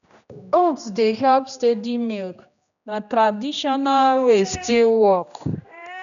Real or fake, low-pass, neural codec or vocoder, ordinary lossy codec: fake; 7.2 kHz; codec, 16 kHz, 1 kbps, X-Codec, HuBERT features, trained on general audio; none